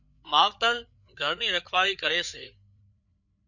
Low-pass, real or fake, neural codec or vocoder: 7.2 kHz; fake; codec, 16 kHz, 4 kbps, FreqCodec, larger model